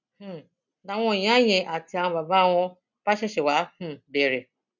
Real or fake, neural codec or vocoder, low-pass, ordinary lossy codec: real; none; 7.2 kHz; none